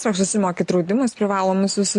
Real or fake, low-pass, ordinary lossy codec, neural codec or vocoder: real; 10.8 kHz; MP3, 48 kbps; none